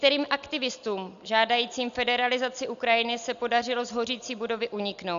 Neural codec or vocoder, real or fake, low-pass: none; real; 7.2 kHz